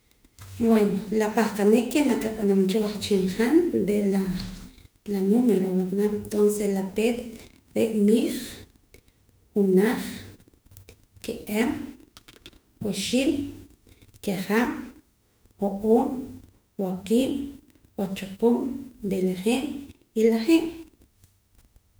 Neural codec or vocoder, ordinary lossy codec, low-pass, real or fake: autoencoder, 48 kHz, 32 numbers a frame, DAC-VAE, trained on Japanese speech; none; none; fake